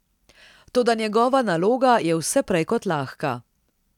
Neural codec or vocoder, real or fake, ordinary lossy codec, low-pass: none; real; none; 19.8 kHz